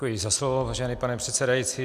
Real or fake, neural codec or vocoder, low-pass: real; none; 14.4 kHz